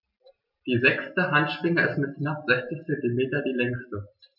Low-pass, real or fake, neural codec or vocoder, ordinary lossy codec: 5.4 kHz; real; none; none